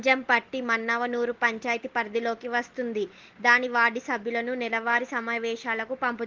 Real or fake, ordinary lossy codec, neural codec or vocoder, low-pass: real; Opus, 16 kbps; none; 7.2 kHz